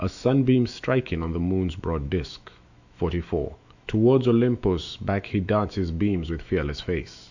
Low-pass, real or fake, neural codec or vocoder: 7.2 kHz; fake; autoencoder, 48 kHz, 128 numbers a frame, DAC-VAE, trained on Japanese speech